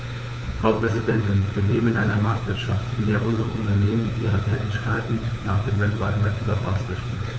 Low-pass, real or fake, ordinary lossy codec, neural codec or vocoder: none; fake; none; codec, 16 kHz, 4 kbps, FunCodec, trained on LibriTTS, 50 frames a second